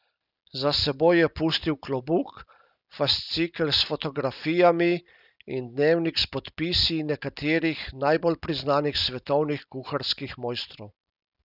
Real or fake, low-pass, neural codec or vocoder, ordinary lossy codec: real; 5.4 kHz; none; none